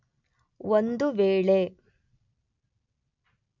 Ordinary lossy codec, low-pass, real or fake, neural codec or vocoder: none; 7.2 kHz; real; none